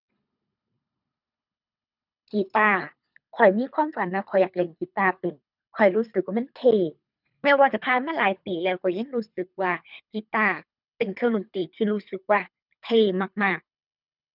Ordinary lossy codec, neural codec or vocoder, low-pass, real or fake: none; codec, 24 kHz, 3 kbps, HILCodec; 5.4 kHz; fake